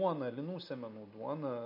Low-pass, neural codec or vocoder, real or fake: 5.4 kHz; none; real